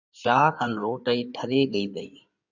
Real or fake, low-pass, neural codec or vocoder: fake; 7.2 kHz; codec, 16 kHz in and 24 kHz out, 2.2 kbps, FireRedTTS-2 codec